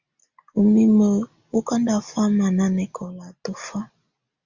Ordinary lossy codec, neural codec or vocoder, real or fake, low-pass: Opus, 64 kbps; none; real; 7.2 kHz